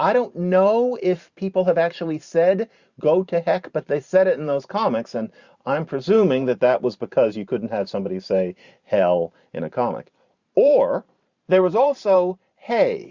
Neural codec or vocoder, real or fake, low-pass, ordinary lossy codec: vocoder, 44.1 kHz, 128 mel bands every 512 samples, BigVGAN v2; fake; 7.2 kHz; Opus, 64 kbps